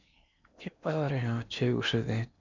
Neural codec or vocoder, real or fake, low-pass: codec, 16 kHz in and 24 kHz out, 0.8 kbps, FocalCodec, streaming, 65536 codes; fake; 7.2 kHz